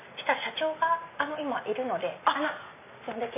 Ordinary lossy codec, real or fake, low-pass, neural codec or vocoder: none; real; 3.6 kHz; none